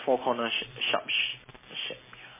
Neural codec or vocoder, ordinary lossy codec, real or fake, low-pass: none; MP3, 16 kbps; real; 3.6 kHz